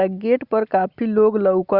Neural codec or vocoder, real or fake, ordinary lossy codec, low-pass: none; real; Opus, 64 kbps; 5.4 kHz